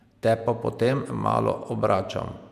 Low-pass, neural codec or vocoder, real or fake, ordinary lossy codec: 14.4 kHz; vocoder, 48 kHz, 128 mel bands, Vocos; fake; none